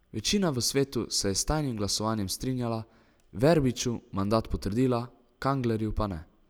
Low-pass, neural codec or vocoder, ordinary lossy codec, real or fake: none; none; none; real